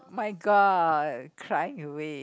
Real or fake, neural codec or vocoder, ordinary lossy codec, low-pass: real; none; none; none